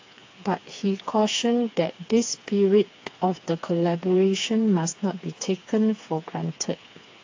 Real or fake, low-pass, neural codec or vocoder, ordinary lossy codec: fake; 7.2 kHz; codec, 16 kHz, 4 kbps, FreqCodec, smaller model; AAC, 48 kbps